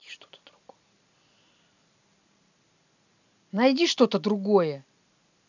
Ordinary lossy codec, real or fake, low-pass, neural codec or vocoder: none; real; 7.2 kHz; none